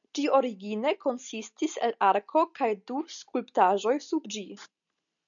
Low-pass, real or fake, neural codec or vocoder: 7.2 kHz; real; none